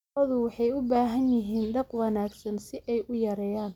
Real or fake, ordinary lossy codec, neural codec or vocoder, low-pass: real; none; none; 19.8 kHz